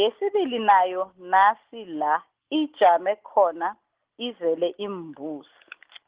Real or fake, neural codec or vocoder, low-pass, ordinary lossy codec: real; none; 3.6 kHz; Opus, 16 kbps